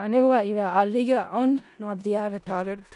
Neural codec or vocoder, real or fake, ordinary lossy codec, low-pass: codec, 16 kHz in and 24 kHz out, 0.4 kbps, LongCat-Audio-Codec, four codebook decoder; fake; none; 10.8 kHz